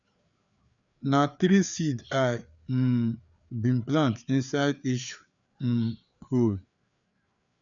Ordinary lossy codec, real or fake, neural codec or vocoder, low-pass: none; fake; codec, 16 kHz, 4 kbps, FreqCodec, larger model; 7.2 kHz